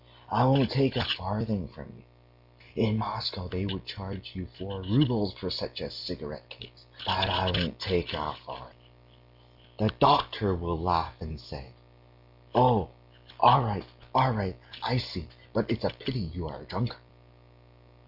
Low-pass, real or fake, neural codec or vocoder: 5.4 kHz; real; none